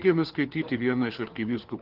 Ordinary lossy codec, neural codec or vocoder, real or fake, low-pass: Opus, 16 kbps; codec, 16 kHz, 4 kbps, FunCodec, trained on LibriTTS, 50 frames a second; fake; 5.4 kHz